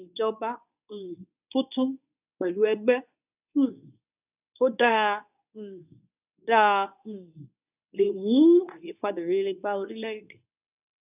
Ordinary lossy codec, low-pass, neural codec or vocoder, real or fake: none; 3.6 kHz; codec, 24 kHz, 0.9 kbps, WavTokenizer, medium speech release version 2; fake